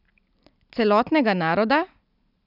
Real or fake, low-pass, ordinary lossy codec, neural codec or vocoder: real; 5.4 kHz; none; none